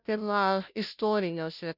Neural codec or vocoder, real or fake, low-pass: codec, 16 kHz, 0.5 kbps, FunCodec, trained on Chinese and English, 25 frames a second; fake; 5.4 kHz